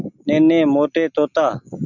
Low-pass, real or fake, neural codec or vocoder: 7.2 kHz; real; none